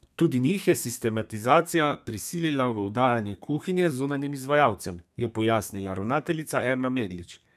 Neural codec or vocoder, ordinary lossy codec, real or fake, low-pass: codec, 32 kHz, 1.9 kbps, SNAC; none; fake; 14.4 kHz